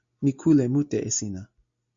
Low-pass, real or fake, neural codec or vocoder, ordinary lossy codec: 7.2 kHz; real; none; AAC, 64 kbps